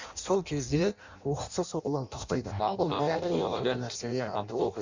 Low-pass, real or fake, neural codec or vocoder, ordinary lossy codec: 7.2 kHz; fake; codec, 16 kHz in and 24 kHz out, 0.6 kbps, FireRedTTS-2 codec; Opus, 64 kbps